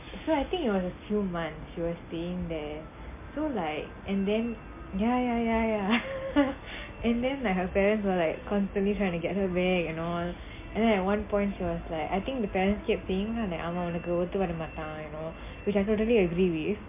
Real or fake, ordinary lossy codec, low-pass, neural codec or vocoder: real; MP3, 24 kbps; 3.6 kHz; none